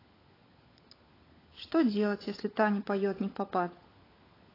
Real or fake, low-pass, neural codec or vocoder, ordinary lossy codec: fake; 5.4 kHz; codec, 16 kHz, 16 kbps, FunCodec, trained on LibriTTS, 50 frames a second; AAC, 24 kbps